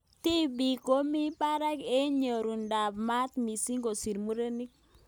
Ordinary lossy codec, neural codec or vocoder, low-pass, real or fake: none; none; none; real